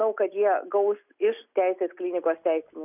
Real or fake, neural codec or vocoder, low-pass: real; none; 3.6 kHz